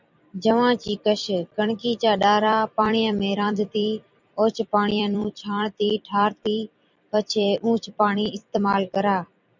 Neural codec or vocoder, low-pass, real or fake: vocoder, 44.1 kHz, 128 mel bands every 256 samples, BigVGAN v2; 7.2 kHz; fake